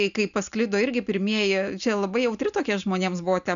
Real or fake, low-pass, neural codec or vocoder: real; 7.2 kHz; none